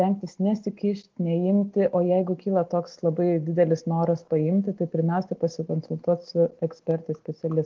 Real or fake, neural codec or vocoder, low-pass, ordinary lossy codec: real; none; 7.2 kHz; Opus, 16 kbps